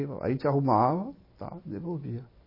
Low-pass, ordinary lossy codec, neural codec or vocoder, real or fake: 5.4 kHz; MP3, 24 kbps; none; real